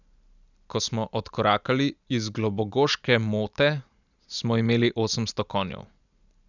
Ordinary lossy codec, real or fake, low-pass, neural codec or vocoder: none; real; 7.2 kHz; none